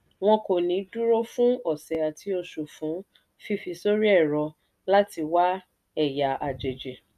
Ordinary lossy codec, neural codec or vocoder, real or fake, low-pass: none; none; real; 14.4 kHz